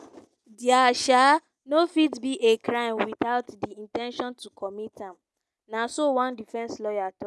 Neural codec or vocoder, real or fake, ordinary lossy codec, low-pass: none; real; none; none